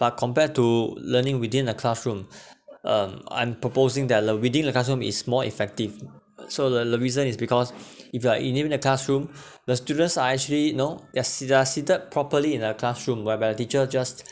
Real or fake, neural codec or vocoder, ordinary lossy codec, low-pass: real; none; none; none